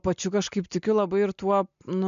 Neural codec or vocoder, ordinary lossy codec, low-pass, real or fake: none; MP3, 64 kbps; 7.2 kHz; real